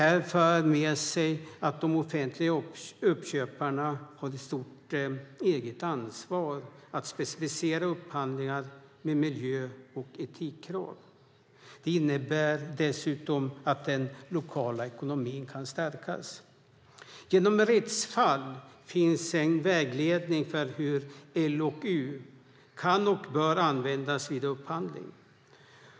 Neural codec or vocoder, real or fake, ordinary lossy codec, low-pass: none; real; none; none